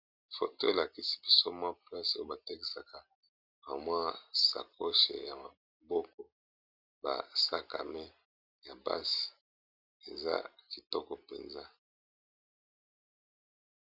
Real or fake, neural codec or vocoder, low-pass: real; none; 5.4 kHz